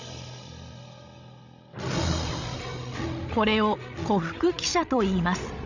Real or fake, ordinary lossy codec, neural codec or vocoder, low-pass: fake; none; codec, 16 kHz, 16 kbps, FreqCodec, larger model; 7.2 kHz